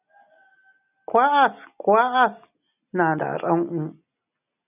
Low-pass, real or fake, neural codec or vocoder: 3.6 kHz; real; none